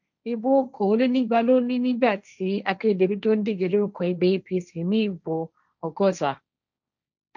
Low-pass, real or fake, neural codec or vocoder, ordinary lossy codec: 7.2 kHz; fake; codec, 16 kHz, 1.1 kbps, Voila-Tokenizer; none